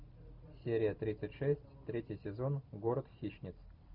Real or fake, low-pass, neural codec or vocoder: real; 5.4 kHz; none